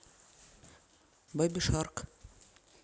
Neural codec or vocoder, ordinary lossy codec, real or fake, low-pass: none; none; real; none